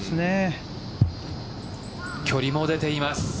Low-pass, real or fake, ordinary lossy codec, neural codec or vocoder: none; real; none; none